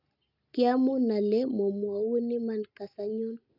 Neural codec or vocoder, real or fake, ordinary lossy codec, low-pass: none; real; none; 5.4 kHz